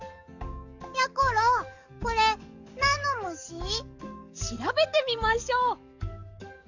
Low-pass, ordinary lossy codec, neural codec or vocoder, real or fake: 7.2 kHz; none; codec, 44.1 kHz, 7.8 kbps, DAC; fake